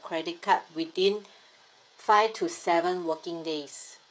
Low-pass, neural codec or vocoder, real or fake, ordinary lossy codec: none; codec, 16 kHz, 16 kbps, FreqCodec, smaller model; fake; none